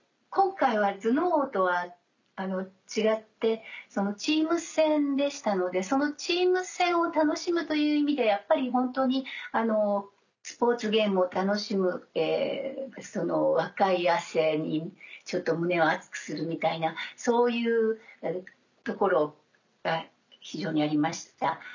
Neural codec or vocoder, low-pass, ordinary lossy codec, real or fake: none; 7.2 kHz; none; real